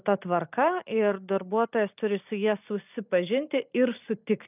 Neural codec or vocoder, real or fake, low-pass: none; real; 3.6 kHz